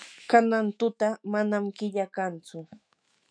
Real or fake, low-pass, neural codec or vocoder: fake; 9.9 kHz; autoencoder, 48 kHz, 128 numbers a frame, DAC-VAE, trained on Japanese speech